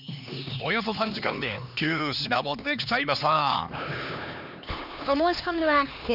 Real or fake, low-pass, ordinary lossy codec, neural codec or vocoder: fake; 5.4 kHz; none; codec, 16 kHz, 2 kbps, X-Codec, HuBERT features, trained on LibriSpeech